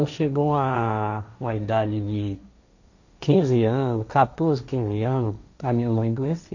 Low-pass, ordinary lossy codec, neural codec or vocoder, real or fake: 7.2 kHz; none; codec, 16 kHz, 1.1 kbps, Voila-Tokenizer; fake